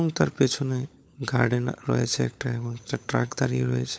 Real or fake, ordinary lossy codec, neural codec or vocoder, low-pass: fake; none; codec, 16 kHz, 16 kbps, FunCodec, trained on LibriTTS, 50 frames a second; none